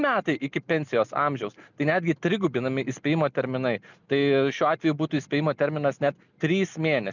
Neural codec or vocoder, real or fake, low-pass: none; real; 7.2 kHz